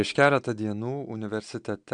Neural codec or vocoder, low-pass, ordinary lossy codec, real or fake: none; 9.9 kHz; MP3, 96 kbps; real